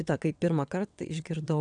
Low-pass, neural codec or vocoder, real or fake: 9.9 kHz; vocoder, 22.05 kHz, 80 mel bands, WaveNeXt; fake